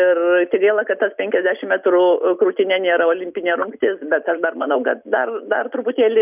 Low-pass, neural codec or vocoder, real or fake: 3.6 kHz; none; real